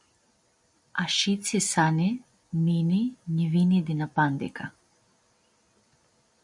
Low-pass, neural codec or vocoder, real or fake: 10.8 kHz; none; real